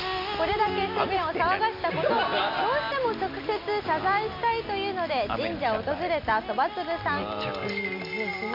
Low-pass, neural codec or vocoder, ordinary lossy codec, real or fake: 5.4 kHz; none; MP3, 48 kbps; real